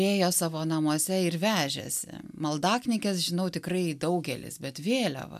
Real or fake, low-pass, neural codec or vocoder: real; 14.4 kHz; none